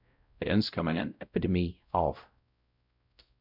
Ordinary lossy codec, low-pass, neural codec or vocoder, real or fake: MP3, 48 kbps; 5.4 kHz; codec, 16 kHz, 0.5 kbps, X-Codec, WavLM features, trained on Multilingual LibriSpeech; fake